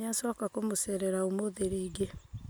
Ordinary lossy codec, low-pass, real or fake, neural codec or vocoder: none; none; real; none